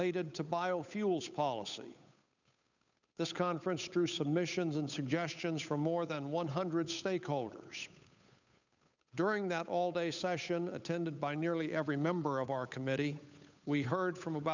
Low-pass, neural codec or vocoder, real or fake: 7.2 kHz; codec, 16 kHz, 8 kbps, FunCodec, trained on Chinese and English, 25 frames a second; fake